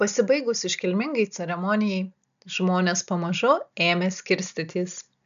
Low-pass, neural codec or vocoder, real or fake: 7.2 kHz; none; real